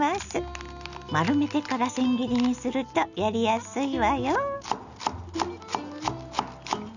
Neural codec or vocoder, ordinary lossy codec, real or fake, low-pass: none; none; real; 7.2 kHz